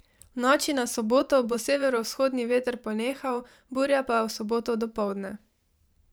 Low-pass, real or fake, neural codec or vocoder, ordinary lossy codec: none; fake; vocoder, 44.1 kHz, 128 mel bands every 512 samples, BigVGAN v2; none